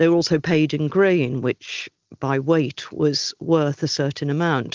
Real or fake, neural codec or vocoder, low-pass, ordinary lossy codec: real; none; 7.2 kHz; Opus, 24 kbps